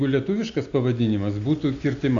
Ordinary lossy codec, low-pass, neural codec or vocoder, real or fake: AAC, 48 kbps; 7.2 kHz; none; real